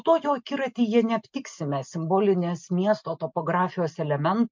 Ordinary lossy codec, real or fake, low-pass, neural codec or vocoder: MP3, 64 kbps; real; 7.2 kHz; none